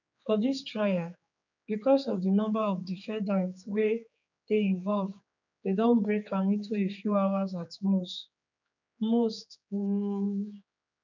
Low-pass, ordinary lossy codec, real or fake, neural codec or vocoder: 7.2 kHz; none; fake; codec, 16 kHz, 4 kbps, X-Codec, HuBERT features, trained on general audio